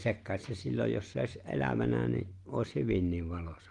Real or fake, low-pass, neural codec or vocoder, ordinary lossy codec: real; 10.8 kHz; none; Opus, 24 kbps